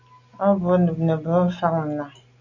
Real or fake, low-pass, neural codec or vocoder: real; 7.2 kHz; none